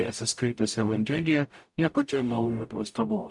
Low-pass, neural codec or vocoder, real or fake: 10.8 kHz; codec, 44.1 kHz, 0.9 kbps, DAC; fake